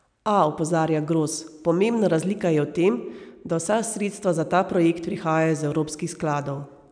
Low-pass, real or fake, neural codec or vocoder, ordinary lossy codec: 9.9 kHz; real; none; none